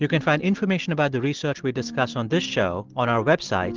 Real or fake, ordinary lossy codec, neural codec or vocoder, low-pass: real; Opus, 16 kbps; none; 7.2 kHz